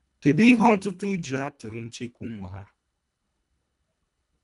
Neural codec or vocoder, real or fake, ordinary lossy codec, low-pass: codec, 24 kHz, 1.5 kbps, HILCodec; fake; none; 10.8 kHz